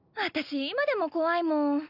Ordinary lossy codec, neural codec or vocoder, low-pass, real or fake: none; none; 5.4 kHz; real